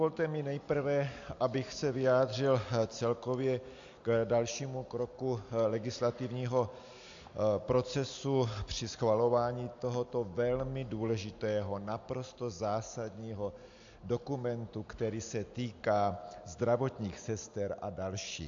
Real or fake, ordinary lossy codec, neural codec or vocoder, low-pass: real; AAC, 64 kbps; none; 7.2 kHz